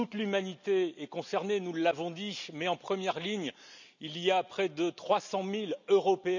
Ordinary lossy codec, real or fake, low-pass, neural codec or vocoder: none; real; 7.2 kHz; none